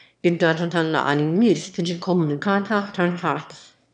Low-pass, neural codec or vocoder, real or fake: 9.9 kHz; autoencoder, 22.05 kHz, a latent of 192 numbers a frame, VITS, trained on one speaker; fake